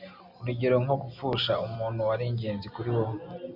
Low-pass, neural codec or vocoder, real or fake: 5.4 kHz; none; real